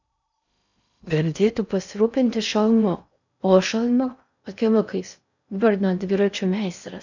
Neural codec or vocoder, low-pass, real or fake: codec, 16 kHz in and 24 kHz out, 0.6 kbps, FocalCodec, streaming, 2048 codes; 7.2 kHz; fake